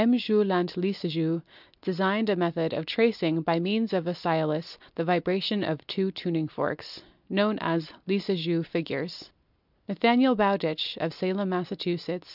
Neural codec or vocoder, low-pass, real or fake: none; 5.4 kHz; real